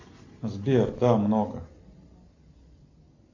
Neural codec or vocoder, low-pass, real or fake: none; 7.2 kHz; real